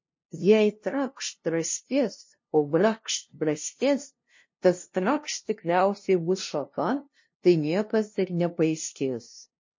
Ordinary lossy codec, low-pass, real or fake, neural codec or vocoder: MP3, 32 kbps; 7.2 kHz; fake; codec, 16 kHz, 0.5 kbps, FunCodec, trained on LibriTTS, 25 frames a second